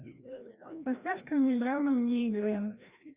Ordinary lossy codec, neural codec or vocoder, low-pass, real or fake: Opus, 64 kbps; codec, 16 kHz, 1 kbps, FreqCodec, larger model; 3.6 kHz; fake